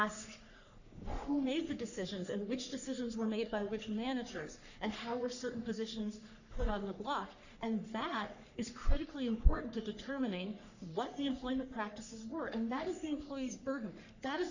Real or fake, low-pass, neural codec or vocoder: fake; 7.2 kHz; codec, 44.1 kHz, 3.4 kbps, Pupu-Codec